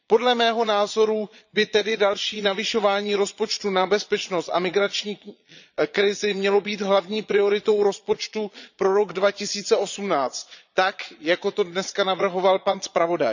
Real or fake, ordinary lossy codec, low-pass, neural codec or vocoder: fake; none; 7.2 kHz; vocoder, 22.05 kHz, 80 mel bands, Vocos